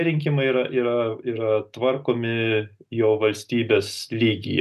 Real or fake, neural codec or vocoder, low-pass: real; none; 14.4 kHz